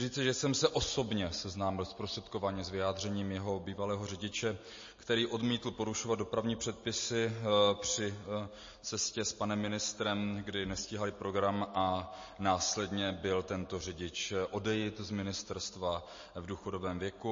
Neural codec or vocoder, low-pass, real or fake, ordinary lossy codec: none; 7.2 kHz; real; MP3, 32 kbps